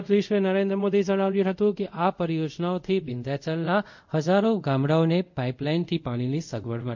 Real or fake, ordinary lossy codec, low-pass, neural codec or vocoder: fake; MP3, 64 kbps; 7.2 kHz; codec, 24 kHz, 0.5 kbps, DualCodec